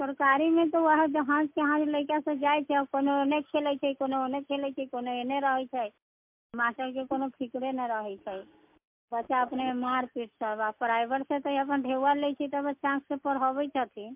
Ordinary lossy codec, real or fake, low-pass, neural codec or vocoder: MP3, 32 kbps; real; 3.6 kHz; none